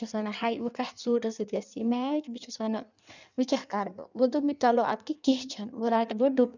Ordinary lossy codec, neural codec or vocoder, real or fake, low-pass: none; codec, 16 kHz in and 24 kHz out, 1.1 kbps, FireRedTTS-2 codec; fake; 7.2 kHz